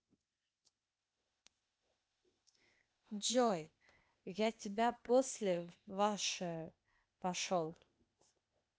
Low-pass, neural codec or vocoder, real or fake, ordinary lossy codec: none; codec, 16 kHz, 0.8 kbps, ZipCodec; fake; none